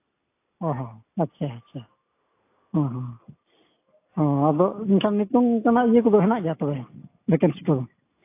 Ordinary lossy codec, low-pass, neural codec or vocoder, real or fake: AAC, 24 kbps; 3.6 kHz; none; real